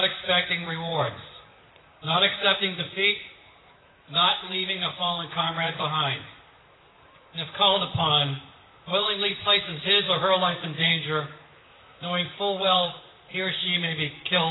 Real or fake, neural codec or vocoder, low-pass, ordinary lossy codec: fake; vocoder, 44.1 kHz, 128 mel bands, Pupu-Vocoder; 7.2 kHz; AAC, 16 kbps